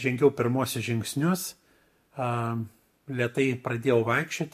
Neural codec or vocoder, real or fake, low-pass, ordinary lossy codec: none; real; 14.4 kHz; MP3, 64 kbps